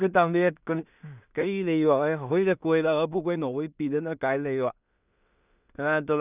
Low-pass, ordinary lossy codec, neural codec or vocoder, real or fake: 3.6 kHz; none; codec, 16 kHz in and 24 kHz out, 0.4 kbps, LongCat-Audio-Codec, two codebook decoder; fake